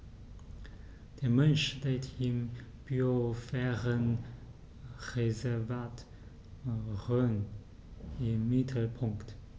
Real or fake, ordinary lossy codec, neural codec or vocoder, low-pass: real; none; none; none